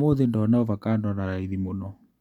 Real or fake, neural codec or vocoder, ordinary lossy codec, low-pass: real; none; none; 19.8 kHz